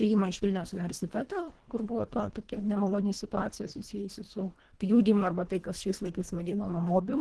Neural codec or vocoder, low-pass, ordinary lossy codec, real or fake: codec, 24 kHz, 1.5 kbps, HILCodec; 10.8 kHz; Opus, 16 kbps; fake